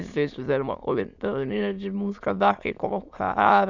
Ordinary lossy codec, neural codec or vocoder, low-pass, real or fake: none; autoencoder, 22.05 kHz, a latent of 192 numbers a frame, VITS, trained on many speakers; 7.2 kHz; fake